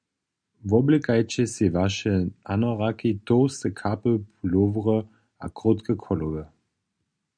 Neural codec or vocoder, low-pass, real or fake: none; 9.9 kHz; real